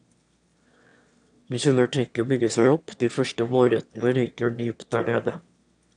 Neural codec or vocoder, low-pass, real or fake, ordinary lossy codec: autoencoder, 22.05 kHz, a latent of 192 numbers a frame, VITS, trained on one speaker; 9.9 kHz; fake; none